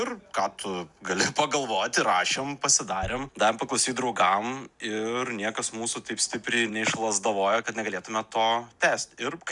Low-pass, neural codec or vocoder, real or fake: 10.8 kHz; none; real